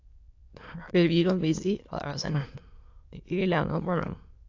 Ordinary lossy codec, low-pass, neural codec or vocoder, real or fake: AAC, 48 kbps; 7.2 kHz; autoencoder, 22.05 kHz, a latent of 192 numbers a frame, VITS, trained on many speakers; fake